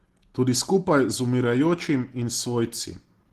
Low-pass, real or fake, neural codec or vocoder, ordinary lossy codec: 14.4 kHz; real; none; Opus, 16 kbps